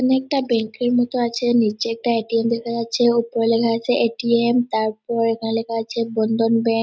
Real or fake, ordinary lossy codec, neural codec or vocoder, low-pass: real; none; none; none